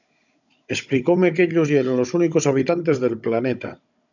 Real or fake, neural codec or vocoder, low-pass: fake; codec, 16 kHz, 16 kbps, FunCodec, trained on Chinese and English, 50 frames a second; 7.2 kHz